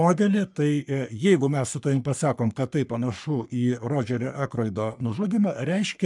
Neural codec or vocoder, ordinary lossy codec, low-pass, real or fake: codec, 44.1 kHz, 3.4 kbps, Pupu-Codec; MP3, 96 kbps; 10.8 kHz; fake